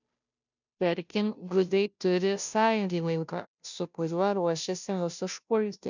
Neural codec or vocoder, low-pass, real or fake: codec, 16 kHz, 0.5 kbps, FunCodec, trained on Chinese and English, 25 frames a second; 7.2 kHz; fake